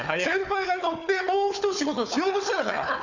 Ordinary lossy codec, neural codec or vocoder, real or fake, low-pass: none; codec, 16 kHz, 4 kbps, FunCodec, trained on Chinese and English, 50 frames a second; fake; 7.2 kHz